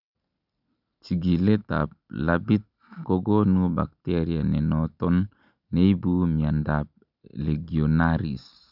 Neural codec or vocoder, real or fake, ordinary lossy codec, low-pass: none; real; none; 5.4 kHz